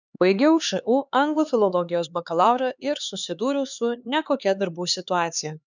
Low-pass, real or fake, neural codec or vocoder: 7.2 kHz; fake; codec, 16 kHz, 4 kbps, X-Codec, HuBERT features, trained on LibriSpeech